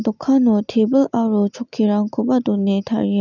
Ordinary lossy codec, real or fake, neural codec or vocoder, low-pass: none; real; none; 7.2 kHz